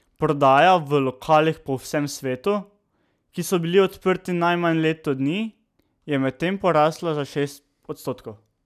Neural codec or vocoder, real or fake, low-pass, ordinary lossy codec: none; real; 14.4 kHz; none